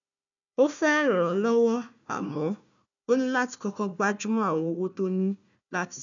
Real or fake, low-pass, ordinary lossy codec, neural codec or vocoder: fake; 7.2 kHz; none; codec, 16 kHz, 1 kbps, FunCodec, trained on Chinese and English, 50 frames a second